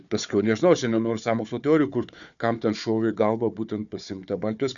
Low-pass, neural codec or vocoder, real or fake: 7.2 kHz; codec, 16 kHz, 4 kbps, FunCodec, trained on Chinese and English, 50 frames a second; fake